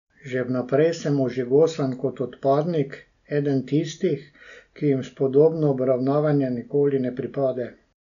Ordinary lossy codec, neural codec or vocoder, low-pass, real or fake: none; none; 7.2 kHz; real